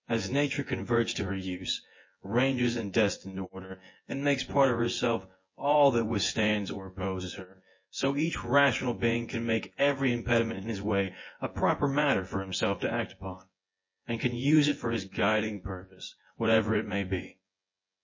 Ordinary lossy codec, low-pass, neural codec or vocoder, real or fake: MP3, 32 kbps; 7.2 kHz; vocoder, 24 kHz, 100 mel bands, Vocos; fake